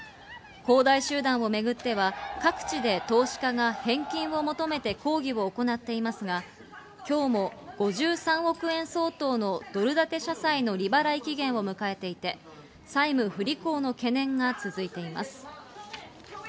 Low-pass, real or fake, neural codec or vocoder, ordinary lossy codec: none; real; none; none